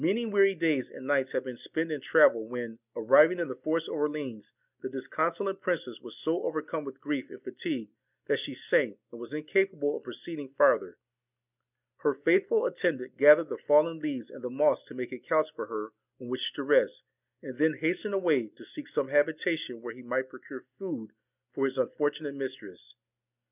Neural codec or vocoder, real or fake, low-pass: none; real; 3.6 kHz